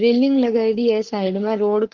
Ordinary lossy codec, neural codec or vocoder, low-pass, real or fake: Opus, 16 kbps; codec, 44.1 kHz, 7.8 kbps, Pupu-Codec; 7.2 kHz; fake